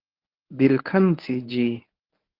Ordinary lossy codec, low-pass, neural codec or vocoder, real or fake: Opus, 32 kbps; 5.4 kHz; codec, 24 kHz, 0.9 kbps, WavTokenizer, medium speech release version 1; fake